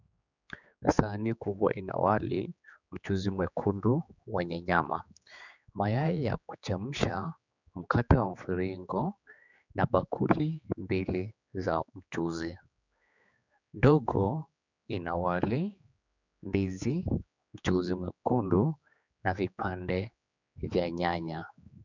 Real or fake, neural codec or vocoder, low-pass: fake; codec, 16 kHz, 4 kbps, X-Codec, HuBERT features, trained on general audio; 7.2 kHz